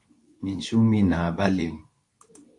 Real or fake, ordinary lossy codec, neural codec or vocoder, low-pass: fake; AAC, 64 kbps; codec, 24 kHz, 0.9 kbps, WavTokenizer, medium speech release version 2; 10.8 kHz